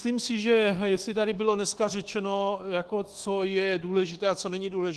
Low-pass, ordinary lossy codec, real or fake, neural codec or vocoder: 10.8 kHz; Opus, 16 kbps; fake; codec, 24 kHz, 1.2 kbps, DualCodec